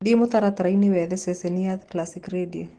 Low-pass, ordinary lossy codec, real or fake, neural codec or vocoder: 10.8 kHz; Opus, 16 kbps; real; none